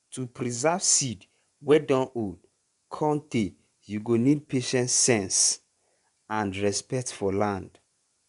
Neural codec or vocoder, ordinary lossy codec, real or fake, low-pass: vocoder, 24 kHz, 100 mel bands, Vocos; none; fake; 10.8 kHz